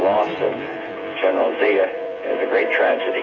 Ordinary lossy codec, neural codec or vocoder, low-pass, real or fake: AAC, 32 kbps; vocoder, 24 kHz, 100 mel bands, Vocos; 7.2 kHz; fake